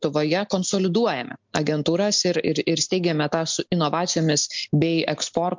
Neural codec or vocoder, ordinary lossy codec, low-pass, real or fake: none; MP3, 64 kbps; 7.2 kHz; real